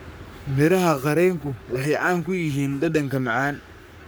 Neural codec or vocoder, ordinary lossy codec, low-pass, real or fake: codec, 44.1 kHz, 3.4 kbps, Pupu-Codec; none; none; fake